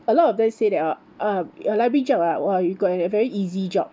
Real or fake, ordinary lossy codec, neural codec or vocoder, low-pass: real; none; none; none